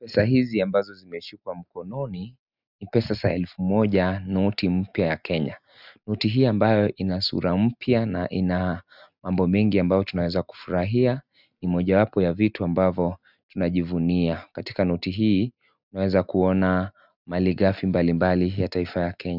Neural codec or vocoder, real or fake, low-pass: none; real; 5.4 kHz